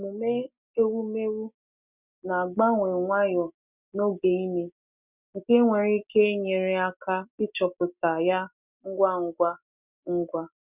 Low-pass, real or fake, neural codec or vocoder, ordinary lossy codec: 3.6 kHz; real; none; none